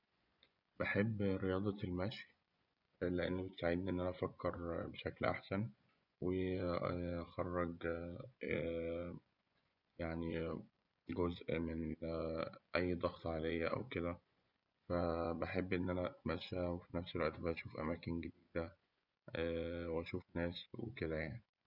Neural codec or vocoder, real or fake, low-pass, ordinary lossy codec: vocoder, 24 kHz, 100 mel bands, Vocos; fake; 5.4 kHz; none